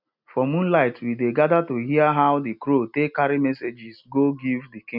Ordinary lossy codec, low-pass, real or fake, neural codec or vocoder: none; 5.4 kHz; real; none